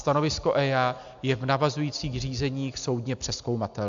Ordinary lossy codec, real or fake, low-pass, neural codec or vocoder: AAC, 64 kbps; real; 7.2 kHz; none